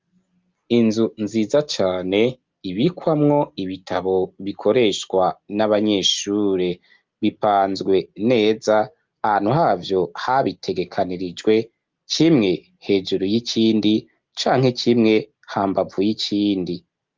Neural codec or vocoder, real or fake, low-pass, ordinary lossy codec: none; real; 7.2 kHz; Opus, 32 kbps